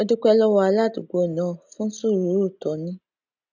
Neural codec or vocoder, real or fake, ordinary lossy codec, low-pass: none; real; none; 7.2 kHz